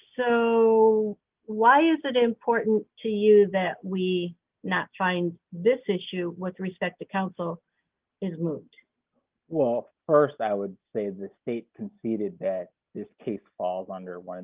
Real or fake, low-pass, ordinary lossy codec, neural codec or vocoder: real; 3.6 kHz; Opus, 32 kbps; none